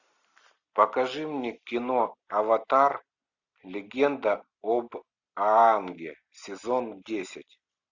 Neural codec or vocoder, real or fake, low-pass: none; real; 7.2 kHz